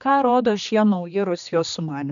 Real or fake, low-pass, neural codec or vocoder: fake; 7.2 kHz; codec, 16 kHz, 2 kbps, X-Codec, HuBERT features, trained on general audio